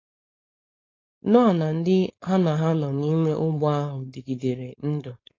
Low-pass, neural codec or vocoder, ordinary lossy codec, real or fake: 7.2 kHz; codec, 16 kHz, 4.8 kbps, FACodec; AAC, 32 kbps; fake